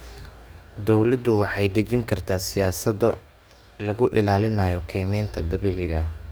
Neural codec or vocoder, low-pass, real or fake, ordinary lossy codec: codec, 44.1 kHz, 2.6 kbps, DAC; none; fake; none